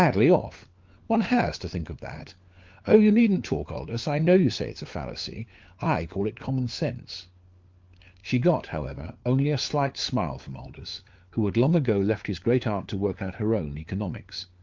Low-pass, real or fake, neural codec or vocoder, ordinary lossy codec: 7.2 kHz; fake; codec, 16 kHz, 4 kbps, FunCodec, trained on LibriTTS, 50 frames a second; Opus, 24 kbps